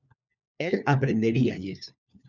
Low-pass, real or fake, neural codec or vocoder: 7.2 kHz; fake; codec, 16 kHz, 4 kbps, FunCodec, trained on LibriTTS, 50 frames a second